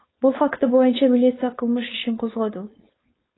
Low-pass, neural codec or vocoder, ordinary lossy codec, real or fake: 7.2 kHz; codec, 16 kHz, 4.8 kbps, FACodec; AAC, 16 kbps; fake